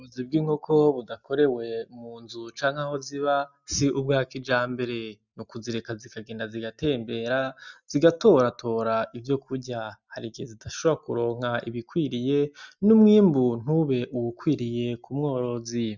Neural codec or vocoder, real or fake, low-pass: none; real; 7.2 kHz